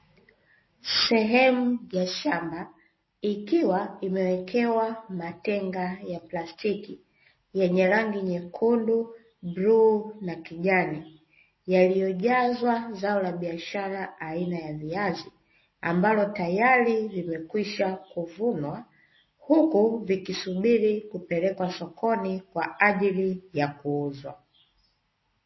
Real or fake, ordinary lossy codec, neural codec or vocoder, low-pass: real; MP3, 24 kbps; none; 7.2 kHz